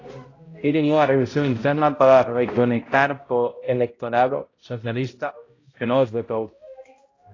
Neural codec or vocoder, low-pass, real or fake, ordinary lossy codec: codec, 16 kHz, 0.5 kbps, X-Codec, HuBERT features, trained on balanced general audio; 7.2 kHz; fake; AAC, 32 kbps